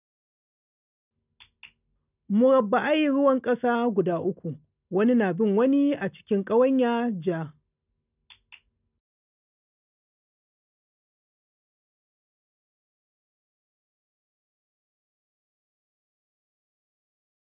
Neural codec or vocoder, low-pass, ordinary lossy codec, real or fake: none; 3.6 kHz; none; real